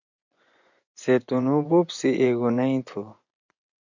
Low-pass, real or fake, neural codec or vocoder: 7.2 kHz; fake; vocoder, 24 kHz, 100 mel bands, Vocos